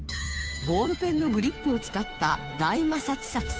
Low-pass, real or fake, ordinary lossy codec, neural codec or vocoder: none; fake; none; codec, 16 kHz, 2 kbps, FunCodec, trained on Chinese and English, 25 frames a second